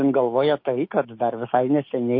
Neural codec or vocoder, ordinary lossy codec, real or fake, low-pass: codec, 16 kHz, 6 kbps, DAC; MP3, 32 kbps; fake; 5.4 kHz